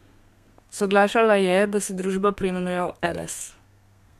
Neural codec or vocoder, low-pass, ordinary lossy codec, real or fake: codec, 32 kHz, 1.9 kbps, SNAC; 14.4 kHz; none; fake